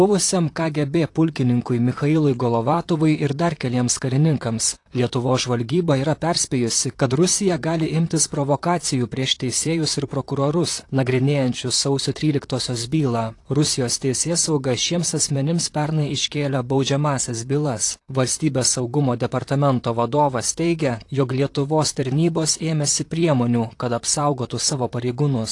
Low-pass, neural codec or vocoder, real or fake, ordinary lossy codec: 10.8 kHz; vocoder, 44.1 kHz, 128 mel bands, Pupu-Vocoder; fake; AAC, 48 kbps